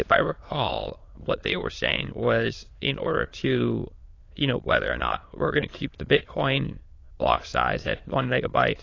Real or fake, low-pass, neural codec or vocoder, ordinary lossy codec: fake; 7.2 kHz; autoencoder, 22.05 kHz, a latent of 192 numbers a frame, VITS, trained on many speakers; AAC, 32 kbps